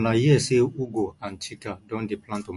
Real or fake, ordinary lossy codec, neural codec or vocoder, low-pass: real; MP3, 48 kbps; none; 14.4 kHz